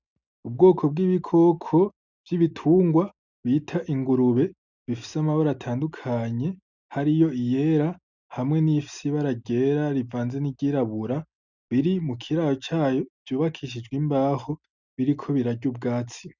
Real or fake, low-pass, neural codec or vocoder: real; 7.2 kHz; none